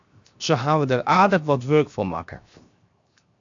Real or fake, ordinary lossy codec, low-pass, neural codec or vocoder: fake; AAC, 64 kbps; 7.2 kHz; codec, 16 kHz, 0.7 kbps, FocalCodec